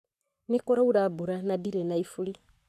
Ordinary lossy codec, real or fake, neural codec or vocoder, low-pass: none; fake; codec, 44.1 kHz, 7.8 kbps, Pupu-Codec; 14.4 kHz